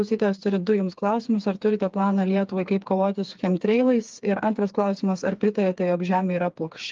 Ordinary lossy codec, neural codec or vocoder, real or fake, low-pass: Opus, 32 kbps; codec, 16 kHz, 4 kbps, FreqCodec, smaller model; fake; 7.2 kHz